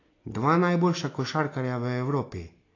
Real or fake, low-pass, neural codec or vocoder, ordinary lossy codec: real; 7.2 kHz; none; AAC, 32 kbps